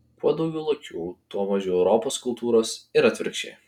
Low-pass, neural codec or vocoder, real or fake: 19.8 kHz; none; real